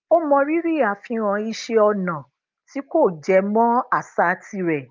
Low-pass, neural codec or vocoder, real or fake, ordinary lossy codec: 7.2 kHz; none; real; Opus, 24 kbps